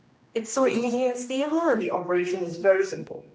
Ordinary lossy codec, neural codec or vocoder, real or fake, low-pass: none; codec, 16 kHz, 1 kbps, X-Codec, HuBERT features, trained on general audio; fake; none